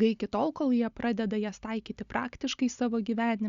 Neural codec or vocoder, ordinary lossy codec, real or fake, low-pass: none; Opus, 64 kbps; real; 7.2 kHz